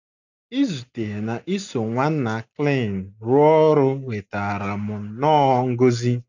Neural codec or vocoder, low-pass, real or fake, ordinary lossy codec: none; 7.2 kHz; real; none